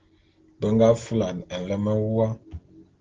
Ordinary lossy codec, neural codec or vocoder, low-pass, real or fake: Opus, 16 kbps; none; 7.2 kHz; real